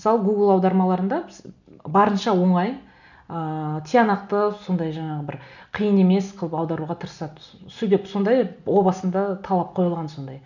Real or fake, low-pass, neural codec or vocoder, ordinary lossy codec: real; 7.2 kHz; none; none